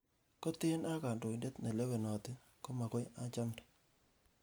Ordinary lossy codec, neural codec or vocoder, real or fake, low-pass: none; none; real; none